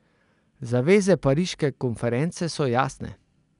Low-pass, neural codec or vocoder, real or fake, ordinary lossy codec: 10.8 kHz; none; real; none